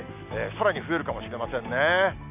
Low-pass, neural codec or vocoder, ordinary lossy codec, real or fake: 3.6 kHz; none; none; real